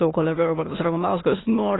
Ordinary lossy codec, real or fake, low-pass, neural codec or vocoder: AAC, 16 kbps; fake; 7.2 kHz; autoencoder, 22.05 kHz, a latent of 192 numbers a frame, VITS, trained on many speakers